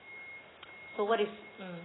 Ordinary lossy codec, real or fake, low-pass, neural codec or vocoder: AAC, 16 kbps; real; 7.2 kHz; none